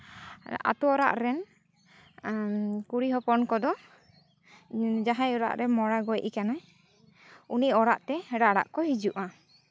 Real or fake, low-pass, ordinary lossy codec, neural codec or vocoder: real; none; none; none